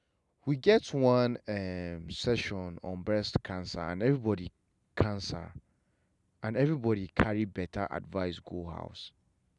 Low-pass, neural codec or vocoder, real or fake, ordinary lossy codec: 10.8 kHz; none; real; none